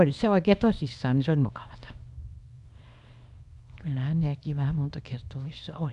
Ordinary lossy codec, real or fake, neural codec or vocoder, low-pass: MP3, 96 kbps; fake; codec, 24 kHz, 0.9 kbps, WavTokenizer, small release; 10.8 kHz